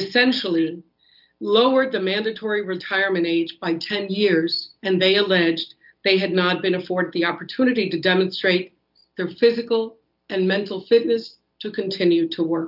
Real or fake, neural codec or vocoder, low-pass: real; none; 5.4 kHz